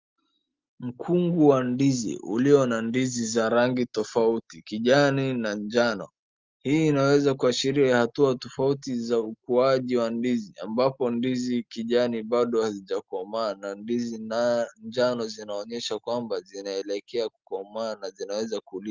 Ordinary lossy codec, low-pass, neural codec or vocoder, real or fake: Opus, 32 kbps; 7.2 kHz; none; real